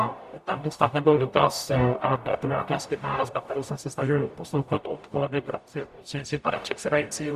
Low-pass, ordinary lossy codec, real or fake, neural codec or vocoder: 14.4 kHz; Opus, 64 kbps; fake; codec, 44.1 kHz, 0.9 kbps, DAC